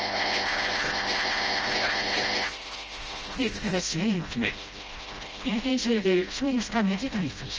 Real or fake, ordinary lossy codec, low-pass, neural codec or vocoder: fake; Opus, 24 kbps; 7.2 kHz; codec, 16 kHz, 0.5 kbps, FreqCodec, smaller model